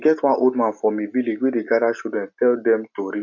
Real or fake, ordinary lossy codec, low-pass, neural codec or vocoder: real; none; 7.2 kHz; none